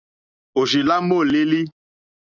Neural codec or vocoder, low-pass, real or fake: none; 7.2 kHz; real